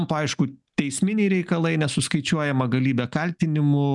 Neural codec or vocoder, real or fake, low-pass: none; real; 10.8 kHz